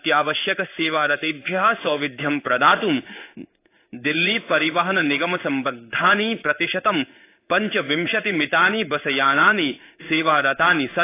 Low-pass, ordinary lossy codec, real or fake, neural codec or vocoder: 3.6 kHz; AAC, 24 kbps; fake; codec, 16 kHz in and 24 kHz out, 1 kbps, XY-Tokenizer